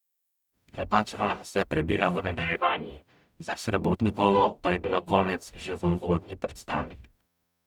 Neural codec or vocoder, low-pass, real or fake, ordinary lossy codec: codec, 44.1 kHz, 0.9 kbps, DAC; 19.8 kHz; fake; none